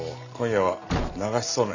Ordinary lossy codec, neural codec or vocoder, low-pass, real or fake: none; none; 7.2 kHz; real